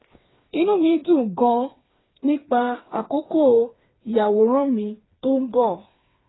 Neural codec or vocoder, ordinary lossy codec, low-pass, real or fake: codec, 16 kHz, 4 kbps, FreqCodec, smaller model; AAC, 16 kbps; 7.2 kHz; fake